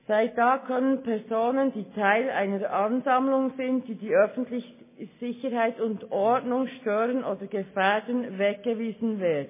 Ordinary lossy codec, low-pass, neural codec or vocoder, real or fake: MP3, 16 kbps; 3.6 kHz; none; real